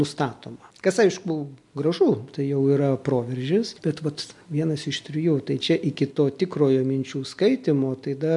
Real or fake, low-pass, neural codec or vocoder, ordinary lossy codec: real; 10.8 kHz; none; MP3, 64 kbps